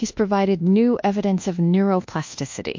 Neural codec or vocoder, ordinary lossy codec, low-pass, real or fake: codec, 24 kHz, 1.2 kbps, DualCodec; MP3, 48 kbps; 7.2 kHz; fake